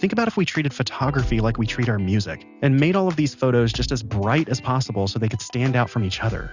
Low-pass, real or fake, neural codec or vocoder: 7.2 kHz; real; none